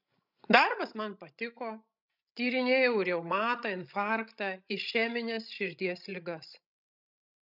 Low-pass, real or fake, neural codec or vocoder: 5.4 kHz; fake; codec, 16 kHz, 16 kbps, FreqCodec, larger model